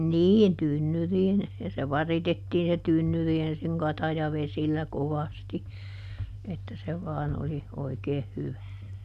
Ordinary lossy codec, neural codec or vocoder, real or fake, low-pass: none; none; real; 14.4 kHz